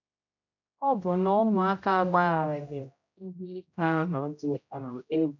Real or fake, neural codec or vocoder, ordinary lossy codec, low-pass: fake; codec, 16 kHz, 0.5 kbps, X-Codec, HuBERT features, trained on general audio; none; 7.2 kHz